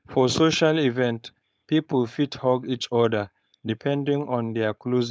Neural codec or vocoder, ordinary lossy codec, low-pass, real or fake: codec, 16 kHz, 4.8 kbps, FACodec; none; none; fake